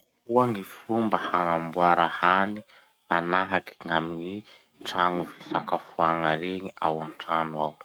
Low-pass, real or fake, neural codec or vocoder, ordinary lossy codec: none; fake; codec, 44.1 kHz, 7.8 kbps, DAC; none